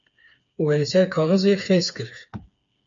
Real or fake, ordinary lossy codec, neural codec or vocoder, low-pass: fake; MP3, 48 kbps; codec, 16 kHz, 4 kbps, FreqCodec, smaller model; 7.2 kHz